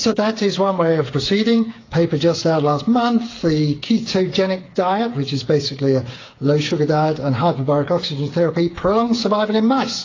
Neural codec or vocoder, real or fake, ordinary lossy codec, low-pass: codec, 16 kHz, 8 kbps, FreqCodec, smaller model; fake; AAC, 32 kbps; 7.2 kHz